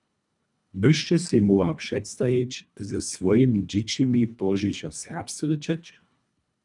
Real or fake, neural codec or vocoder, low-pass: fake; codec, 24 kHz, 1.5 kbps, HILCodec; 10.8 kHz